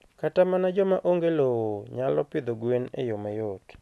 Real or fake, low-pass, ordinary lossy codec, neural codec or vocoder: real; none; none; none